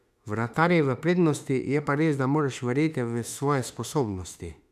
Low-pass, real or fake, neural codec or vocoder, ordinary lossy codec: 14.4 kHz; fake; autoencoder, 48 kHz, 32 numbers a frame, DAC-VAE, trained on Japanese speech; none